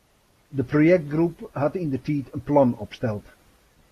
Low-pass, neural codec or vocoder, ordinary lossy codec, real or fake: 14.4 kHz; none; AAC, 48 kbps; real